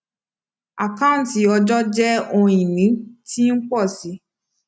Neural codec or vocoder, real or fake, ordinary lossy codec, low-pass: none; real; none; none